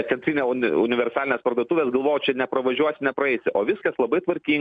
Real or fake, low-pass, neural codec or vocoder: real; 9.9 kHz; none